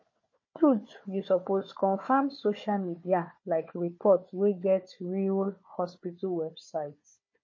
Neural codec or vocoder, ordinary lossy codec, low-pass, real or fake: codec, 16 kHz, 4 kbps, FunCodec, trained on Chinese and English, 50 frames a second; MP3, 32 kbps; 7.2 kHz; fake